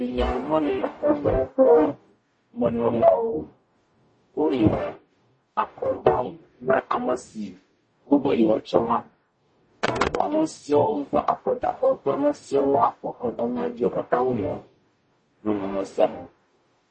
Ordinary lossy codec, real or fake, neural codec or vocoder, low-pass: MP3, 32 kbps; fake; codec, 44.1 kHz, 0.9 kbps, DAC; 9.9 kHz